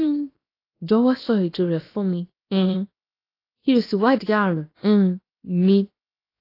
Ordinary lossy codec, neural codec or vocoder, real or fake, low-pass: AAC, 32 kbps; codec, 16 kHz, 0.7 kbps, FocalCodec; fake; 5.4 kHz